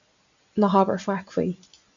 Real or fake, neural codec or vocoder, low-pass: real; none; 7.2 kHz